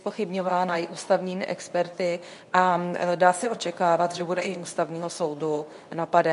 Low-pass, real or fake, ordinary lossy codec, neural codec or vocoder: 10.8 kHz; fake; MP3, 64 kbps; codec, 24 kHz, 0.9 kbps, WavTokenizer, medium speech release version 2